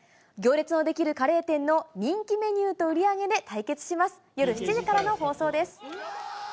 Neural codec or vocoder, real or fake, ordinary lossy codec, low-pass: none; real; none; none